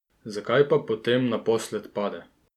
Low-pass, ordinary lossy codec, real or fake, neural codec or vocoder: 19.8 kHz; none; real; none